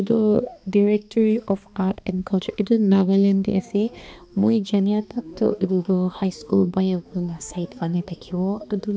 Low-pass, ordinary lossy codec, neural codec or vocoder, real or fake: none; none; codec, 16 kHz, 2 kbps, X-Codec, HuBERT features, trained on balanced general audio; fake